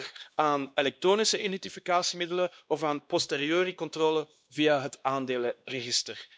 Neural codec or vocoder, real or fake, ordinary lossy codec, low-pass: codec, 16 kHz, 2 kbps, X-Codec, WavLM features, trained on Multilingual LibriSpeech; fake; none; none